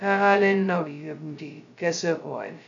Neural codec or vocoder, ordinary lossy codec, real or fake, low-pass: codec, 16 kHz, 0.2 kbps, FocalCodec; MP3, 96 kbps; fake; 7.2 kHz